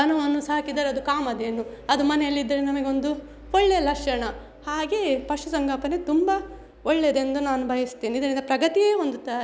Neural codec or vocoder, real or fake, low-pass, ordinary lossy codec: none; real; none; none